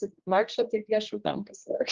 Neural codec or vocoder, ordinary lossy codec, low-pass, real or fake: codec, 16 kHz, 1 kbps, X-Codec, HuBERT features, trained on general audio; Opus, 24 kbps; 7.2 kHz; fake